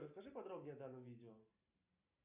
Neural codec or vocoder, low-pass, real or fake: none; 3.6 kHz; real